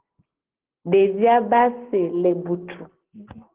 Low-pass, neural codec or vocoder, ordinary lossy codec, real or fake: 3.6 kHz; none; Opus, 16 kbps; real